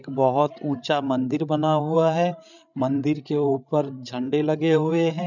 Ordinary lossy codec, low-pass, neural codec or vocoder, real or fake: none; 7.2 kHz; codec, 16 kHz, 8 kbps, FreqCodec, larger model; fake